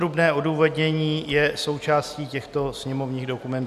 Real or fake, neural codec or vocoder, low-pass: real; none; 14.4 kHz